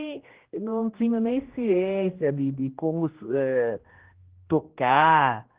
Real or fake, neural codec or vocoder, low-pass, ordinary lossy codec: fake; codec, 16 kHz, 1 kbps, X-Codec, HuBERT features, trained on general audio; 3.6 kHz; Opus, 16 kbps